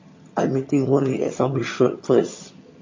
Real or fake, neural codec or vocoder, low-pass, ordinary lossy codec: fake; vocoder, 22.05 kHz, 80 mel bands, HiFi-GAN; 7.2 kHz; MP3, 32 kbps